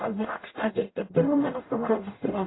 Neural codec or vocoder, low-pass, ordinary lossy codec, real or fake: codec, 44.1 kHz, 0.9 kbps, DAC; 7.2 kHz; AAC, 16 kbps; fake